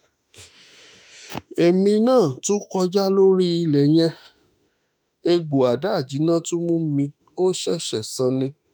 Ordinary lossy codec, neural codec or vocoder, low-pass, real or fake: none; autoencoder, 48 kHz, 32 numbers a frame, DAC-VAE, trained on Japanese speech; none; fake